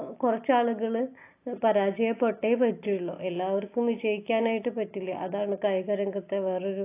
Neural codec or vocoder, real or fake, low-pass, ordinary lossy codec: none; real; 3.6 kHz; AAC, 24 kbps